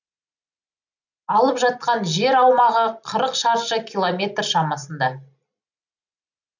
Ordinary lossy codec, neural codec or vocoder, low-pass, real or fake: none; none; 7.2 kHz; real